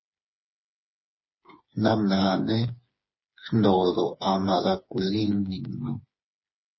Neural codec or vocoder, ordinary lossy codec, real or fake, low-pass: codec, 16 kHz, 4 kbps, FreqCodec, smaller model; MP3, 24 kbps; fake; 7.2 kHz